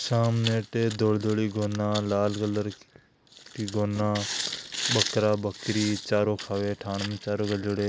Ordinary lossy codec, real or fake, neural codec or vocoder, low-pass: none; real; none; none